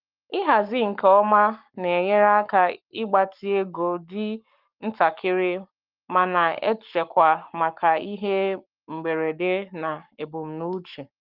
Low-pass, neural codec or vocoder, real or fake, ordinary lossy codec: 5.4 kHz; codec, 44.1 kHz, 7.8 kbps, Pupu-Codec; fake; Opus, 32 kbps